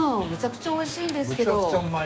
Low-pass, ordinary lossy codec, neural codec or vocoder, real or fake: none; none; codec, 16 kHz, 6 kbps, DAC; fake